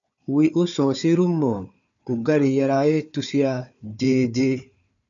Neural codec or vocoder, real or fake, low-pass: codec, 16 kHz, 4 kbps, FunCodec, trained on Chinese and English, 50 frames a second; fake; 7.2 kHz